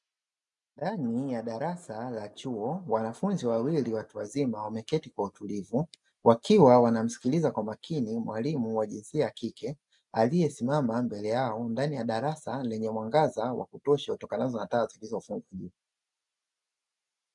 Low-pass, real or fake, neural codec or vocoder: 10.8 kHz; real; none